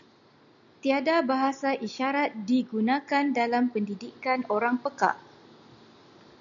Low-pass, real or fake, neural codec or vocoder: 7.2 kHz; real; none